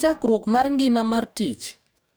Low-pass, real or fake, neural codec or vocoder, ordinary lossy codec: none; fake; codec, 44.1 kHz, 2.6 kbps, DAC; none